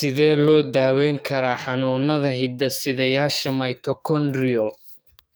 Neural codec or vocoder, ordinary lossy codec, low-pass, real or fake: codec, 44.1 kHz, 2.6 kbps, SNAC; none; none; fake